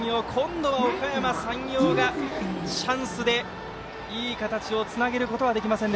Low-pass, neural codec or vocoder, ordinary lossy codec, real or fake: none; none; none; real